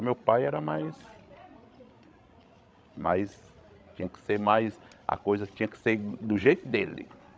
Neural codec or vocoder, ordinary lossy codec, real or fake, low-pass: codec, 16 kHz, 16 kbps, FreqCodec, larger model; none; fake; none